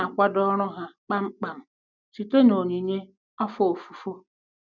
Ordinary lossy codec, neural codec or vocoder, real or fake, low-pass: none; none; real; 7.2 kHz